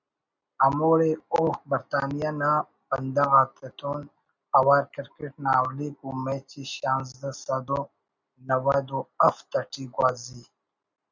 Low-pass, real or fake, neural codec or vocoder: 7.2 kHz; real; none